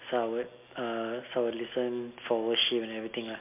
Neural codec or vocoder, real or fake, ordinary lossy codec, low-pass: none; real; MP3, 32 kbps; 3.6 kHz